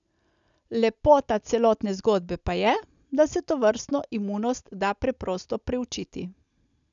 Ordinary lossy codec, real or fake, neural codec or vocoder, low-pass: none; real; none; 7.2 kHz